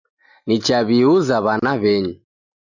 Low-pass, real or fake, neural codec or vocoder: 7.2 kHz; real; none